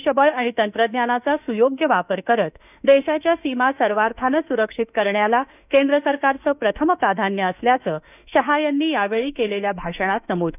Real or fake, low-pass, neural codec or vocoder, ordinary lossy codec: fake; 3.6 kHz; autoencoder, 48 kHz, 32 numbers a frame, DAC-VAE, trained on Japanese speech; none